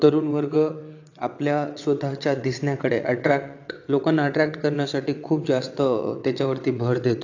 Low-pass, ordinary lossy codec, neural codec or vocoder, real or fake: 7.2 kHz; AAC, 48 kbps; vocoder, 22.05 kHz, 80 mel bands, WaveNeXt; fake